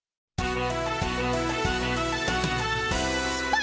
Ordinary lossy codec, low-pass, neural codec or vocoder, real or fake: none; none; none; real